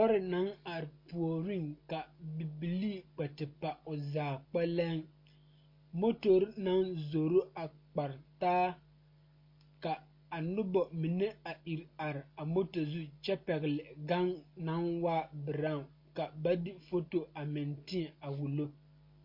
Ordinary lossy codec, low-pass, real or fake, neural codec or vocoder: MP3, 32 kbps; 5.4 kHz; real; none